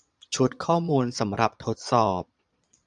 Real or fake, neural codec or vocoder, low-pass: fake; vocoder, 22.05 kHz, 80 mel bands, Vocos; 9.9 kHz